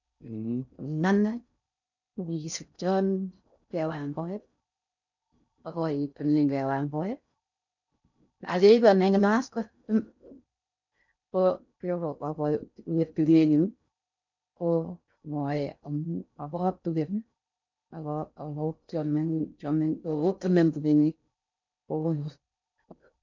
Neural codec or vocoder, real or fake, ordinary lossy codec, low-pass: codec, 16 kHz in and 24 kHz out, 0.6 kbps, FocalCodec, streaming, 4096 codes; fake; none; 7.2 kHz